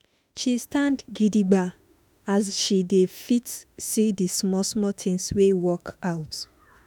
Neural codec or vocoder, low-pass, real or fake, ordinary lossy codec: autoencoder, 48 kHz, 32 numbers a frame, DAC-VAE, trained on Japanese speech; none; fake; none